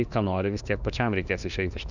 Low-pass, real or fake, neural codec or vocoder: 7.2 kHz; fake; autoencoder, 48 kHz, 32 numbers a frame, DAC-VAE, trained on Japanese speech